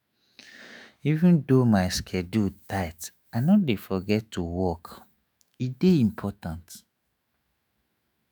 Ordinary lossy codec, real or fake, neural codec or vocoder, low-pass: none; fake; autoencoder, 48 kHz, 128 numbers a frame, DAC-VAE, trained on Japanese speech; none